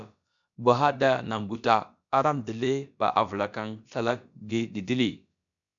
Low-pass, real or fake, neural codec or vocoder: 7.2 kHz; fake; codec, 16 kHz, about 1 kbps, DyCAST, with the encoder's durations